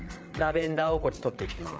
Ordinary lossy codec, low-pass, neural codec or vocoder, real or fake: none; none; codec, 16 kHz, 8 kbps, FreqCodec, larger model; fake